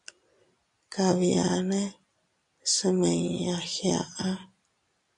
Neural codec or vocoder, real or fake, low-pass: none; real; 10.8 kHz